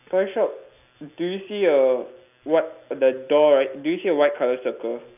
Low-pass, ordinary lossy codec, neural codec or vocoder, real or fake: 3.6 kHz; none; none; real